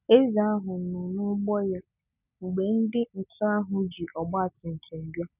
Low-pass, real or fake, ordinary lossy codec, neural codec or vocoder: 3.6 kHz; real; none; none